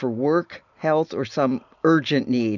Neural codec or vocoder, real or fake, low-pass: none; real; 7.2 kHz